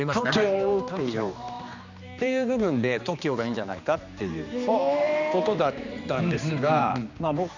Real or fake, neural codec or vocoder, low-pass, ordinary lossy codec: fake; codec, 16 kHz, 2 kbps, X-Codec, HuBERT features, trained on general audio; 7.2 kHz; none